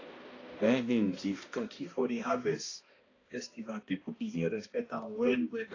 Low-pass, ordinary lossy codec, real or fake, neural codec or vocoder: 7.2 kHz; AAC, 32 kbps; fake; codec, 16 kHz, 1 kbps, X-Codec, HuBERT features, trained on balanced general audio